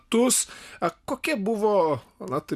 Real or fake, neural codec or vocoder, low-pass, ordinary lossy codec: real; none; 14.4 kHz; Opus, 64 kbps